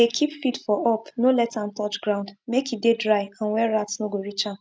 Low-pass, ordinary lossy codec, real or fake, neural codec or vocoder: none; none; real; none